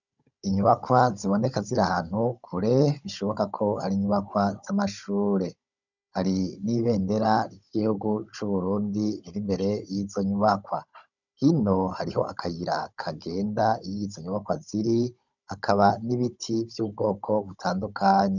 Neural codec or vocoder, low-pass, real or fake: codec, 16 kHz, 16 kbps, FunCodec, trained on Chinese and English, 50 frames a second; 7.2 kHz; fake